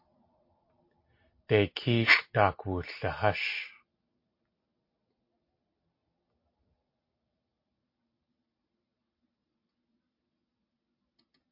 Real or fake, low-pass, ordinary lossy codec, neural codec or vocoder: real; 5.4 kHz; MP3, 32 kbps; none